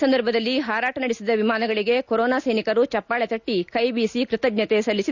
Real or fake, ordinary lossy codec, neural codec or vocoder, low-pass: real; none; none; 7.2 kHz